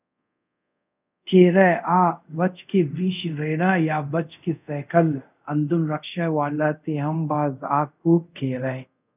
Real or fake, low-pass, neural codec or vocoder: fake; 3.6 kHz; codec, 24 kHz, 0.5 kbps, DualCodec